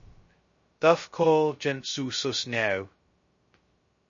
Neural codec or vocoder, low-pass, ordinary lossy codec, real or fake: codec, 16 kHz, 0.2 kbps, FocalCodec; 7.2 kHz; MP3, 32 kbps; fake